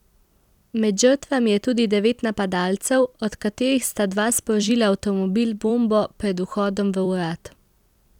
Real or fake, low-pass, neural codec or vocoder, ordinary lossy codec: fake; 19.8 kHz; vocoder, 44.1 kHz, 128 mel bands every 256 samples, BigVGAN v2; none